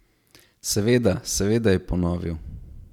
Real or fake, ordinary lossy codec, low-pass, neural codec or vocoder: fake; none; 19.8 kHz; vocoder, 44.1 kHz, 128 mel bands every 512 samples, BigVGAN v2